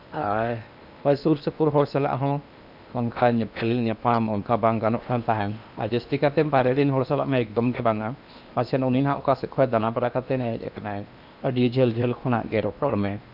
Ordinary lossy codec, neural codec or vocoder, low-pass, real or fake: none; codec, 16 kHz in and 24 kHz out, 0.8 kbps, FocalCodec, streaming, 65536 codes; 5.4 kHz; fake